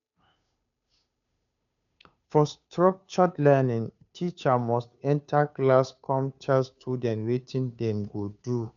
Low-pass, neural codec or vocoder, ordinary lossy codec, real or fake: 7.2 kHz; codec, 16 kHz, 2 kbps, FunCodec, trained on Chinese and English, 25 frames a second; none; fake